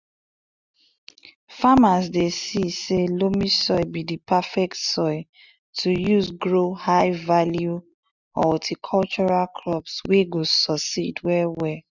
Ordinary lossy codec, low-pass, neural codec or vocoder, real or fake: none; 7.2 kHz; none; real